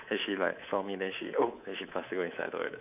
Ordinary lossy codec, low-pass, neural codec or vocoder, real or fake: none; 3.6 kHz; codec, 24 kHz, 3.1 kbps, DualCodec; fake